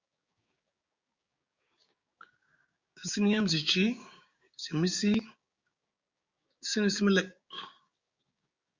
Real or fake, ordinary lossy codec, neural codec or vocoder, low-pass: fake; Opus, 64 kbps; codec, 16 kHz, 6 kbps, DAC; 7.2 kHz